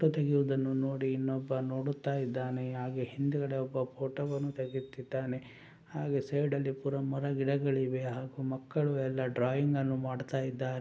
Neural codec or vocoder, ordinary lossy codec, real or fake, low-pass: none; none; real; none